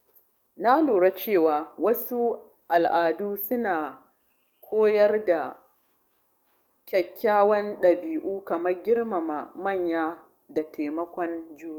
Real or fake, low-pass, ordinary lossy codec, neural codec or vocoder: fake; 19.8 kHz; none; codec, 44.1 kHz, 7.8 kbps, DAC